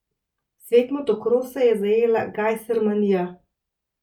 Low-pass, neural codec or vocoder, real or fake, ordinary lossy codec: 19.8 kHz; none; real; none